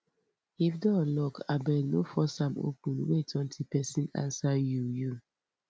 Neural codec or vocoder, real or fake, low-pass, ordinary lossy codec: none; real; none; none